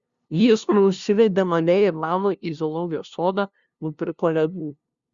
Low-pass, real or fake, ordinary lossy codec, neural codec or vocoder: 7.2 kHz; fake; Opus, 64 kbps; codec, 16 kHz, 0.5 kbps, FunCodec, trained on LibriTTS, 25 frames a second